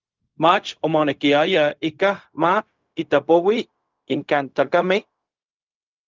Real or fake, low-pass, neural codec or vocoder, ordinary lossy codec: fake; 7.2 kHz; codec, 16 kHz, 0.4 kbps, LongCat-Audio-Codec; Opus, 24 kbps